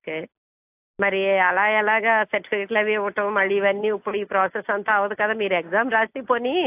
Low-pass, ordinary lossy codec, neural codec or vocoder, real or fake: 3.6 kHz; AAC, 32 kbps; none; real